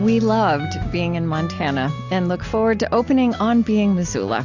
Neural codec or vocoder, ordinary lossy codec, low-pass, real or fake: none; AAC, 48 kbps; 7.2 kHz; real